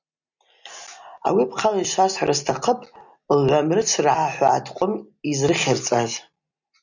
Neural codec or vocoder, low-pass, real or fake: none; 7.2 kHz; real